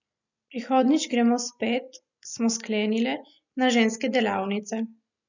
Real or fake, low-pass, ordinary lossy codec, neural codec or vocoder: real; 7.2 kHz; none; none